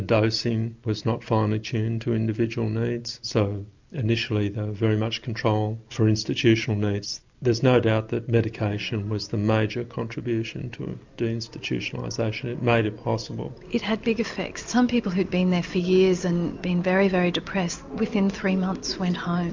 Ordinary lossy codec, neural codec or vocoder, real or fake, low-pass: MP3, 64 kbps; none; real; 7.2 kHz